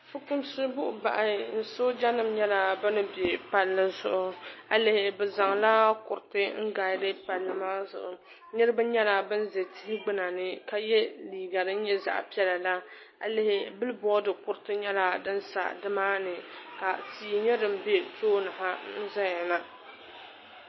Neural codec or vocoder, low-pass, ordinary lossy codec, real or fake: none; 7.2 kHz; MP3, 24 kbps; real